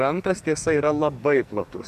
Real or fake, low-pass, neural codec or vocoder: fake; 14.4 kHz; codec, 44.1 kHz, 2.6 kbps, SNAC